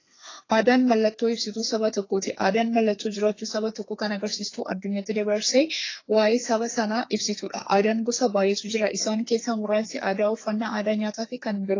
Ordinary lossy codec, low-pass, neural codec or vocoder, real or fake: AAC, 32 kbps; 7.2 kHz; codec, 32 kHz, 1.9 kbps, SNAC; fake